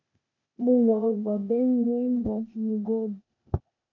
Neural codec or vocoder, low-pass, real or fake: codec, 16 kHz, 0.8 kbps, ZipCodec; 7.2 kHz; fake